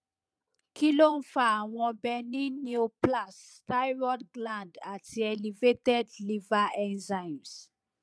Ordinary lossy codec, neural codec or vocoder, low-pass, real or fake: none; vocoder, 22.05 kHz, 80 mel bands, Vocos; none; fake